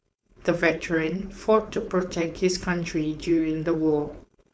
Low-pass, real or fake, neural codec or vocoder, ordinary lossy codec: none; fake; codec, 16 kHz, 4.8 kbps, FACodec; none